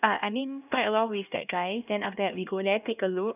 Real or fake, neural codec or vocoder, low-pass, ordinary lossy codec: fake; codec, 16 kHz, 1 kbps, X-Codec, HuBERT features, trained on LibriSpeech; 3.6 kHz; none